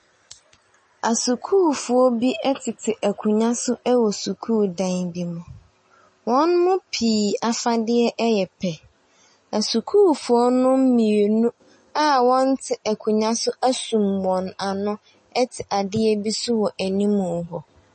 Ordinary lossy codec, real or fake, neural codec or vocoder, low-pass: MP3, 32 kbps; real; none; 10.8 kHz